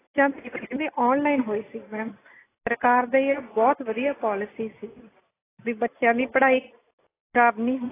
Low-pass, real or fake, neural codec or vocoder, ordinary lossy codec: 3.6 kHz; real; none; AAC, 16 kbps